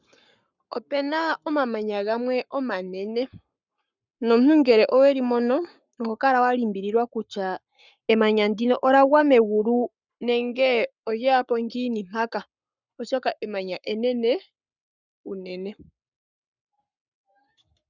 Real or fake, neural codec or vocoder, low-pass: fake; codec, 44.1 kHz, 7.8 kbps, Pupu-Codec; 7.2 kHz